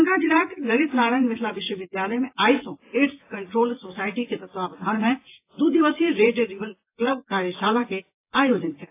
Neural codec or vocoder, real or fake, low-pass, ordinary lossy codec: vocoder, 24 kHz, 100 mel bands, Vocos; fake; 3.6 kHz; AAC, 24 kbps